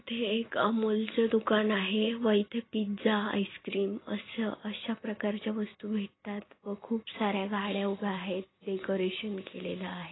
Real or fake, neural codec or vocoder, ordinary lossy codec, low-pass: real; none; AAC, 16 kbps; 7.2 kHz